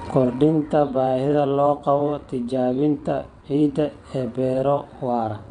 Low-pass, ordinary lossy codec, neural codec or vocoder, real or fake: 9.9 kHz; none; vocoder, 22.05 kHz, 80 mel bands, WaveNeXt; fake